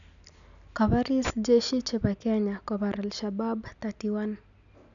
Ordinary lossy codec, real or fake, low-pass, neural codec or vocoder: none; real; 7.2 kHz; none